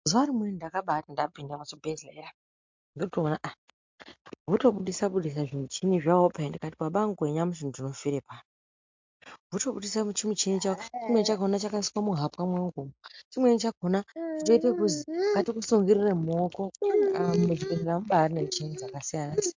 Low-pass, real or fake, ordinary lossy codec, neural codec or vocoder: 7.2 kHz; real; MP3, 64 kbps; none